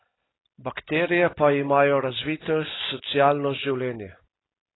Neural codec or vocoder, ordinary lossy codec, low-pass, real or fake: none; AAC, 16 kbps; 7.2 kHz; real